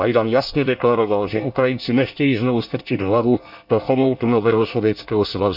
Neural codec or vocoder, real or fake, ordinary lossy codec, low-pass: codec, 24 kHz, 1 kbps, SNAC; fake; none; 5.4 kHz